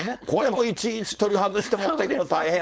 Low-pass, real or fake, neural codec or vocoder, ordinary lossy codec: none; fake; codec, 16 kHz, 4.8 kbps, FACodec; none